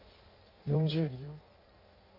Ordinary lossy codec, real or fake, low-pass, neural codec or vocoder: none; fake; 5.4 kHz; codec, 16 kHz in and 24 kHz out, 1.1 kbps, FireRedTTS-2 codec